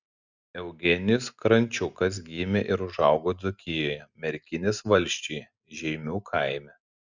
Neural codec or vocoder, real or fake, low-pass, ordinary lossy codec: none; real; 7.2 kHz; Opus, 64 kbps